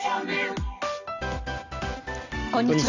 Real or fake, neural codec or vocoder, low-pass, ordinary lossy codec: real; none; 7.2 kHz; none